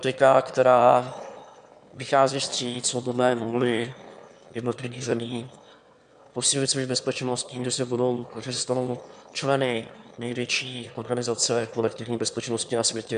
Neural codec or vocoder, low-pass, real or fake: autoencoder, 22.05 kHz, a latent of 192 numbers a frame, VITS, trained on one speaker; 9.9 kHz; fake